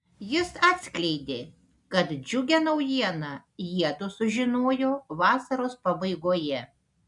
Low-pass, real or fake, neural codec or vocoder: 10.8 kHz; real; none